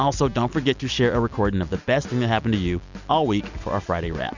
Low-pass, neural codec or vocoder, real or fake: 7.2 kHz; none; real